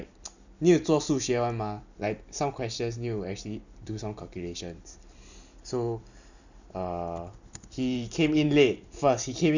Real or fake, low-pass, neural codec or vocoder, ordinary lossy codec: fake; 7.2 kHz; vocoder, 44.1 kHz, 128 mel bands every 256 samples, BigVGAN v2; none